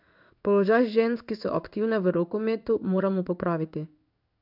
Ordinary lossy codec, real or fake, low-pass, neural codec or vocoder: none; fake; 5.4 kHz; codec, 16 kHz in and 24 kHz out, 1 kbps, XY-Tokenizer